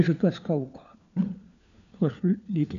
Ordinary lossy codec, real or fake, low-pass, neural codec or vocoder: none; fake; 7.2 kHz; codec, 16 kHz, 4 kbps, FunCodec, trained on LibriTTS, 50 frames a second